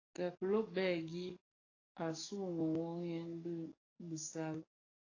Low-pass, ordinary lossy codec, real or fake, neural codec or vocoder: 7.2 kHz; AAC, 32 kbps; fake; codec, 44.1 kHz, 7.8 kbps, DAC